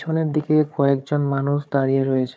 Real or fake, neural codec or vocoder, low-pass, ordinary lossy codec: fake; codec, 16 kHz, 4 kbps, FunCodec, trained on Chinese and English, 50 frames a second; none; none